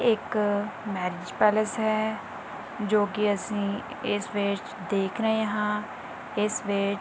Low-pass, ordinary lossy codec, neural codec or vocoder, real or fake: none; none; none; real